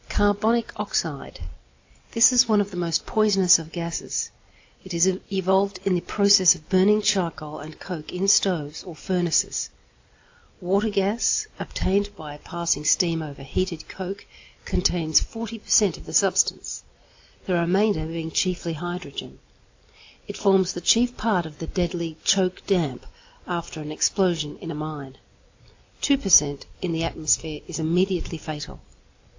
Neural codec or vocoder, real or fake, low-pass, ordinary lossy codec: none; real; 7.2 kHz; AAC, 48 kbps